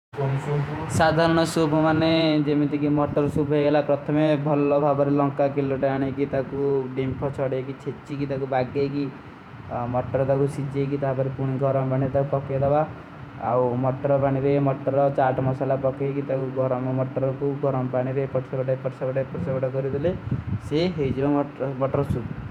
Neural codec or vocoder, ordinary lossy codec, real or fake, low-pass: vocoder, 48 kHz, 128 mel bands, Vocos; none; fake; 19.8 kHz